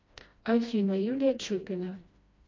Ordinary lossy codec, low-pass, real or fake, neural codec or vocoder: MP3, 48 kbps; 7.2 kHz; fake; codec, 16 kHz, 1 kbps, FreqCodec, smaller model